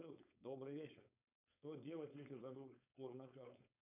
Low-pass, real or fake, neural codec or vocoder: 3.6 kHz; fake; codec, 16 kHz, 4.8 kbps, FACodec